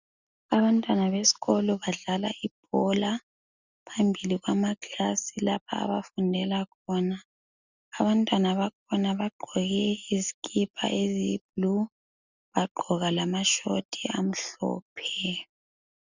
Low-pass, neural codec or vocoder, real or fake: 7.2 kHz; none; real